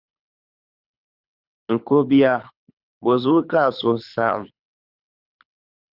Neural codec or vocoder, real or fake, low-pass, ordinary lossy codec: codec, 24 kHz, 6 kbps, HILCodec; fake; 5.4 kHz; Opus, 64 kbps